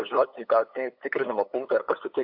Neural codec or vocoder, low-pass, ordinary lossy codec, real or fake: codec, 16 kHz, 8 kbps, FunCodec, trained on LibriTTS, 25 frames a second; 5.4 kHz; AAC, 48 kbps; fake